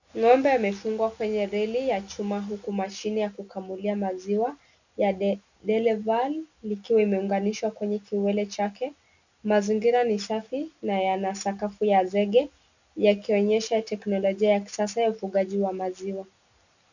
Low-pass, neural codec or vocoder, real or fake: 7.2 kHz; none; real